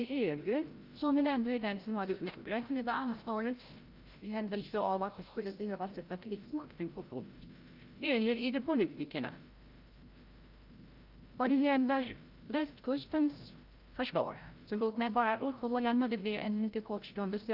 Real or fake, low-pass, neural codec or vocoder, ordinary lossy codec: fake; 5.4 kHz; codec, 16 kHz, 0.5 kbps, FreqCodec, larger model; Opus, 24 kbps